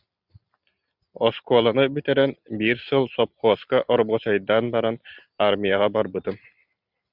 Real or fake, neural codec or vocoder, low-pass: real; none; 5.4 kHz